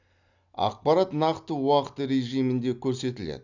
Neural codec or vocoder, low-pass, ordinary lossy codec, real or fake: none; 7.2 kHz; none; real